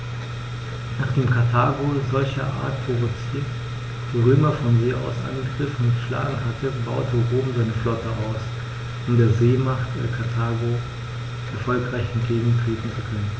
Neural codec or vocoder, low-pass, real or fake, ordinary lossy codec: none; none; real; none